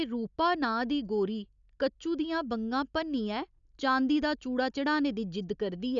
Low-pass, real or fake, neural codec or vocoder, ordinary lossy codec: 7.2 kHz; real; none; none